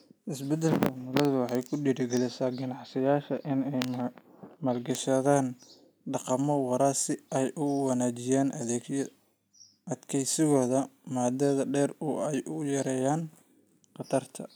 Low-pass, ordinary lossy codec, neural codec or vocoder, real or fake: none; none; none; real